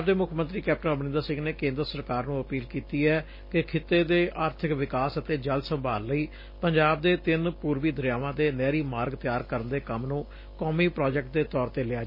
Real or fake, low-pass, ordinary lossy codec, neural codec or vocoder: real; 5.4 kHz; MP3, 24 kbps; none